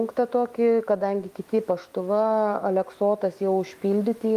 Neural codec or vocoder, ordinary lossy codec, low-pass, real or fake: autoencoder, 48 kHz, 128 numbers a frame, DAC-VAE, trained on Japanese speech; Opus, 32 kbps; 14.4 kHz; fake